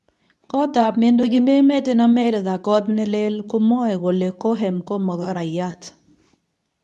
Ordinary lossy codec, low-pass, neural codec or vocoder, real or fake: none; none; codec, 24 kHz, 0.9 kbps, WavTokenizer, medium speech release version 2; fake